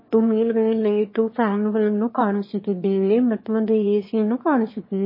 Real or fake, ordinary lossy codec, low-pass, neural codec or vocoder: fake; MP3, 24 kbps; 5.4 kHz; autoencoder, 22.05 kHz, a latent of 192 numbers a frame, VITS, trained on one speaker